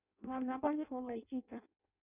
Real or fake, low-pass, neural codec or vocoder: fake; 3.6 kHz; codec, 16 kHz in and 24 kHz out, 0.6 kbps, FireRedTTS-2 codec